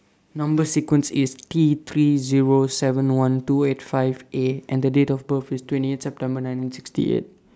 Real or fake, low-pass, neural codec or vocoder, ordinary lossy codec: real; none; none; none